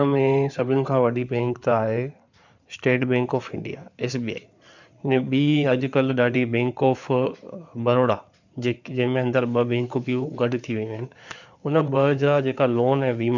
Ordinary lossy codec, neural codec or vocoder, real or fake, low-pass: none; codec, 16 kHz in and 24 kHz out, 2.2 kbps, FireRedTTS-2 codec; fake; 7.2 kHz